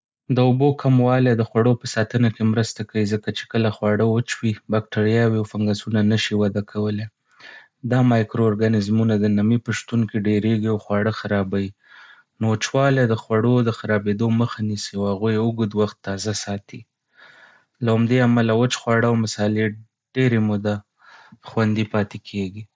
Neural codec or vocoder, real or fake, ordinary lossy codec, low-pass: none; real; none; none